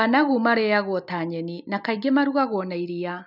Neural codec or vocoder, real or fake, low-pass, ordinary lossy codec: none; real; 5.4 kHz; none